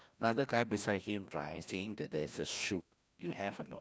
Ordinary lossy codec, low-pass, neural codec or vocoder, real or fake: none; none; codec, 16 kHz, 1 kbps, FreqCodec, larger model; fake